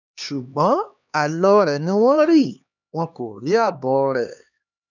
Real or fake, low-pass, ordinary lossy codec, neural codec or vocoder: fake; 7.2 kHz; none; codec, 16 kHz, 2 kbps, X-Codec, HuBERT features, trained on LibriSpeech